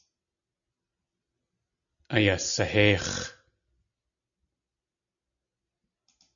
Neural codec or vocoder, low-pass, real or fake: none; 7.2 kHz; real